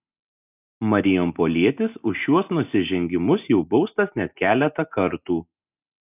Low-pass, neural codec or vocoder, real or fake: 3.6 kHz; none; real